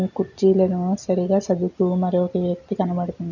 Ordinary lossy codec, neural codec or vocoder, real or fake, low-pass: none; none; real; 7.2 kHz